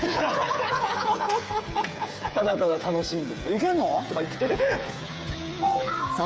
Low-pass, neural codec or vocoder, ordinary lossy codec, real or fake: none; codec, 16 kHz, 8 kbps, FreqCodec, smaller model; none; fake